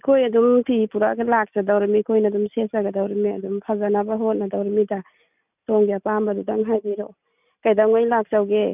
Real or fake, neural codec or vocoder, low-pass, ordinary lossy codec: real; none; 3.6 kHz; none